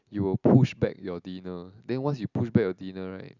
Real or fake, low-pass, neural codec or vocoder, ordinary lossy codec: real; 7.2 kHz; none; none